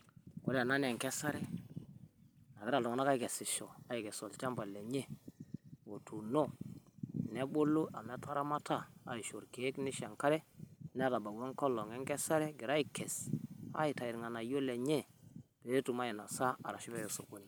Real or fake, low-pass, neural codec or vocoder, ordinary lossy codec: real; none; none; none